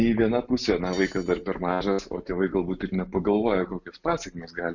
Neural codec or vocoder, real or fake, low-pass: none; real; 7.2 kHz